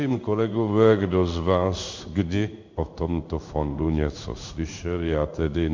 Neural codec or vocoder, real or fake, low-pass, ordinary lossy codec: codec, 16 kHz in and 24 kHz out, 1 kbps, XY-Tokenizer; fake; 7.2 kHz; MP3, 64 kbps